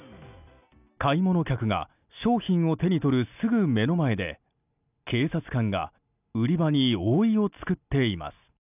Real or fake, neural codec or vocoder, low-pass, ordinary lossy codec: real; none; 3.6 kHz; none